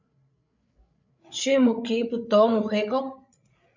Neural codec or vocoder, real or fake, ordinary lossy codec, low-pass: codec, 16 kHz, 8 kbps, FreqCodec, larger model; fake; MP3, 48 kbps; 7.2 kHz